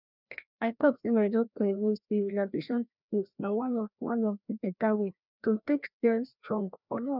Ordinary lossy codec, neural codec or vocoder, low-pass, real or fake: none; codec, 16 kHz, 1 kbps, FreqCodec, larger model; 5.4 kHz; fake